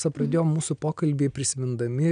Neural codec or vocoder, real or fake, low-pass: none; real; 9.9 kHz